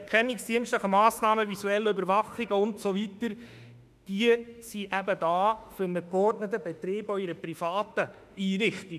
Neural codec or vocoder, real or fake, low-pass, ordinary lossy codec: autoencoder, 48 kHz, 32 numbers a frame, DAC-VAE, trained on Japanese speech; fake; 14.4 kHz; none